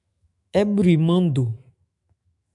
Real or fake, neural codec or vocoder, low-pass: fake; autoencoder, 48 kHz, 128 numbers a frame, DAC-VAE, trained on Japanese speech; 10.8 kHz